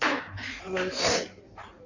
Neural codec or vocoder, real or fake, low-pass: codec, 16 kHz in and 24 kHz out, 1.1 kbps, FireRedTTS-2 codec; fake; 7.2 kHz